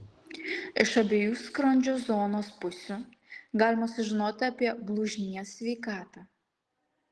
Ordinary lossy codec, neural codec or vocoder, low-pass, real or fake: Opus, 16 kbps; none; 10.8 kHz; real